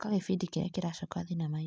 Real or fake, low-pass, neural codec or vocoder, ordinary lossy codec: real; none; none; none